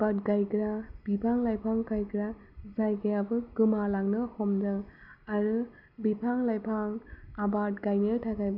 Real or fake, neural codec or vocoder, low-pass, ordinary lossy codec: real; none; 5.4 kHz; none